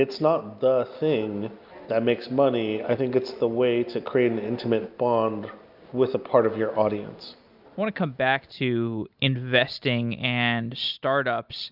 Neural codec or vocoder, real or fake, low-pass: none; real; 5.4 kHz